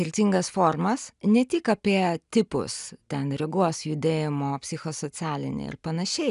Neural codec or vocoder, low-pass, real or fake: none; 10.8 kHz; real